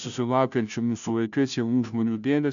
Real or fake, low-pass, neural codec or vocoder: fake; 7.2 kHz; codec, 16 kHz, 0.5 kbps, FunCodec, trained on Chinese and English, 25 frames a second